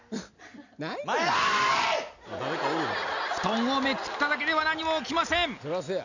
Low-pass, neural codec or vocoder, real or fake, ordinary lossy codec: 7.2 kHz; none; real; none